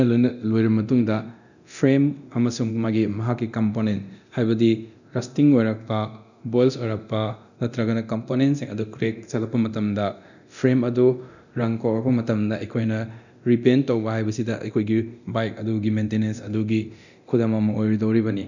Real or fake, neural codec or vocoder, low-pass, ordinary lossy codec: fake; codec, 24 kHz, 0.9 kbps, DualCodec; 7.2 kHz; none